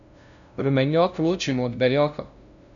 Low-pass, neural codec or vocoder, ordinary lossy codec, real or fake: 7.2 kHz; codec, 16 kHz, 0.5 kbps, FunCodec, trained on LibriTTS, 25 frames a second; none; fake